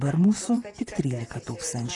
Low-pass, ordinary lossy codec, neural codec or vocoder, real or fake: 10.8 kHz; AAC, 32 kbps; vocoder, 44.1 kHz, 128 mel bands, Pupu-Vocoder; fake